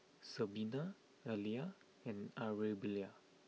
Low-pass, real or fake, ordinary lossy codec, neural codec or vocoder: none; real; none; none